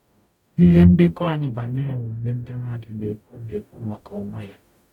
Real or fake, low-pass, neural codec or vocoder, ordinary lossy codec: fake; 19.8 kHz; codec, 44.1 kHz, 0.9 kbps, DAC; none